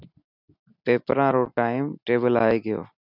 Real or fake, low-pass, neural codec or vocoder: real; 5.4 kHz; none